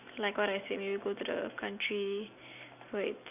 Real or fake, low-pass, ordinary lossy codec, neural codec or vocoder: real; 3.6 kHz; none; none